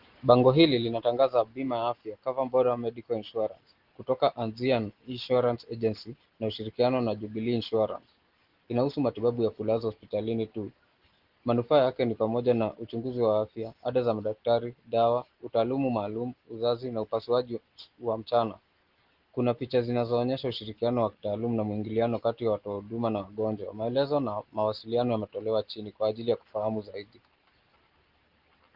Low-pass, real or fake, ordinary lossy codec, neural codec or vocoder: 5.4 kHz; real; Opus, 16 kbps; none